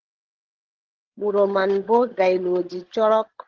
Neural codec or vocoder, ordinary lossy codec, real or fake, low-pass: codec, 16 kHz, 16 kbps, FreqCodec, larger model; Opus, 16 kbps; fake; 7.2 kHz